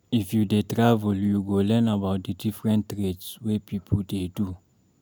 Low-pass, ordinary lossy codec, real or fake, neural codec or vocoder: none; none; real; none